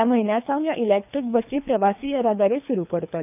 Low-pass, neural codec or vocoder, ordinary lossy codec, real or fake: 3.6 kHz; codec, 24 kHz, 3 kbps, HILCodec; none; fake